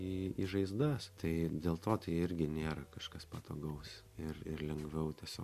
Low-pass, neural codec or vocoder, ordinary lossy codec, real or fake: 14.4 kHz; none; MP3, 64 kbps; real